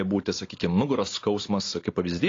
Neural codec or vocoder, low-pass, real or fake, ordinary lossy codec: none; 7.2 kHz; real; AAC, 32 kbps